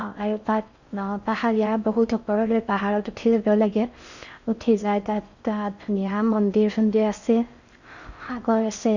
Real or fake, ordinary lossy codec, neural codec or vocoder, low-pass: fake; Opus, 64 kbps; codec, 16 kHz in and 24 kHz out, 0.6 kbps, FocalCodec, streaming, 4096 codes; 7.2 kHz